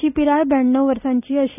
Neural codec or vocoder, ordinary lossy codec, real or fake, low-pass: none; none; real; 3.6 kHz